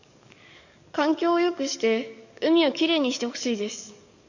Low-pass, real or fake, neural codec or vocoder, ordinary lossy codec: 7.2 kHz; fake; codec, 44.1 kHz, 7.8 kbps, DAC; none